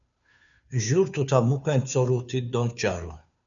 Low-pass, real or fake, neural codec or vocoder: 7.2 kHz; fake; codec, 16 kHz, 2 kbps, FunCodec, trained on Chinese and English, 25 frames a second